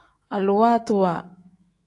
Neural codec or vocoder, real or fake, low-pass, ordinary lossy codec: autoencoder, 48 kHz, 128 numbers a frame, DAC-VAE, trained on Japanese speech; fake; 10.8 kHz; AAC, 48 kbps